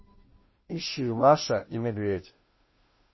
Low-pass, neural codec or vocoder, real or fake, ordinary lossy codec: 7.2 kHz; codec, 16 kHz, 0.5 kbps, FunCodec, trained on Chinese and English, 25 frames a second; fake; MP3, 24 kbps